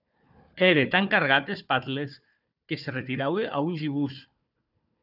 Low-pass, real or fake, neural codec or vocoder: 5.4 kHz; fake; codec, 16 kHz, 4 kbps, FunCodec, trained on Chinese and English, 50 frames a second